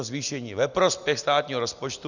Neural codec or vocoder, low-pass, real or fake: none; 7.2 kHz; real